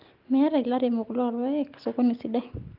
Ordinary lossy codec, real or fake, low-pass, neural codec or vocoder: Opus, 32 kbps; real; 5.4 kHz; none